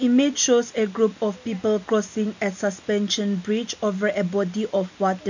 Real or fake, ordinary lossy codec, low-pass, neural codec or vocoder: real; none; 7.2 kHz; none